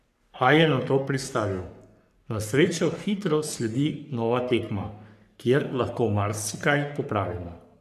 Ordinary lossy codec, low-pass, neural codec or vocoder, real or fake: none; 14.4 kHz; codec, 44.1 kHz, 3.4 kbps, Pupu-Codec; fake